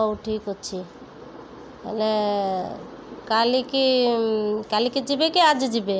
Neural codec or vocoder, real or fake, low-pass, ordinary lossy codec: none; real; none; none